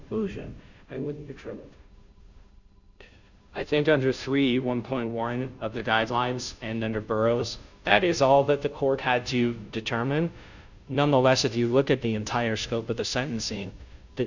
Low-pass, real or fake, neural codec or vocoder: 7.2 kHz; fake; codec, 16 kHz, 0.5 kbps, FunCodec, trained on Chinese and English, 25 frames a second